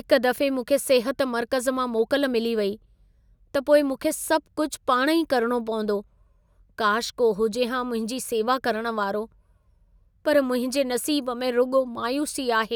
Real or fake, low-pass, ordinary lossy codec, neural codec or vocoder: real; none; none; none